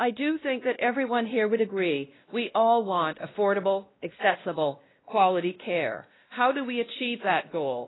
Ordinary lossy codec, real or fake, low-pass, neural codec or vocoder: AAC, 16 kbps; fake; 7.2 kHz; codec, 16 kHz, 1 kbps, X-Codec, WavLM features, trained on Multilingual LibriSpeech